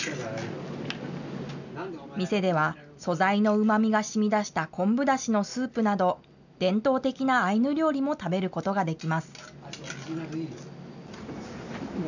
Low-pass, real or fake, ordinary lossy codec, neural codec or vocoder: 7.2 kHz; real; none; none